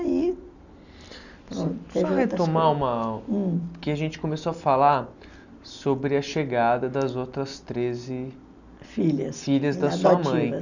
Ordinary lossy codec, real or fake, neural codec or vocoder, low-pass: none; real; none; 7.2 kHz